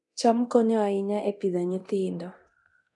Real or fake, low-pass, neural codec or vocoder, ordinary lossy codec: fake; 10.8 kHz; codec, 24 kHz, 0.9 kbps, DualCodec; none